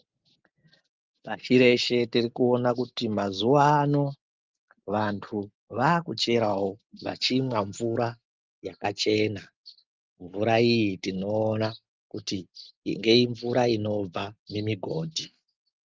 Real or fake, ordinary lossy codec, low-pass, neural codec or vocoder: real; Opus, 32 kbps; 7.2 kHz; none